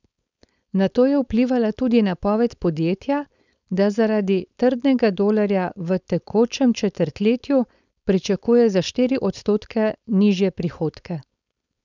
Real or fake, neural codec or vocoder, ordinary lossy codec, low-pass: fake; codec, 16 kHz, 4.8 kbps, FACodec; none; 7.2 kHz